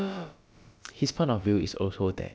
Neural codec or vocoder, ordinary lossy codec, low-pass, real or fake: codec, 16 kHz, about 1 kbps, DyCAST, with the encoder's durations; none; none; fake